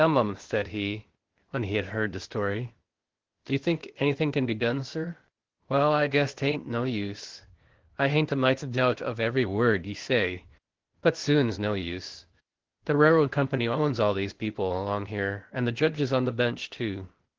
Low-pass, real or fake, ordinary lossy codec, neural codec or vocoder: 7.2 kHz; fake; Opus, 16 kbps; codec, 16 kHz, 0.8 kbps, ZipCodec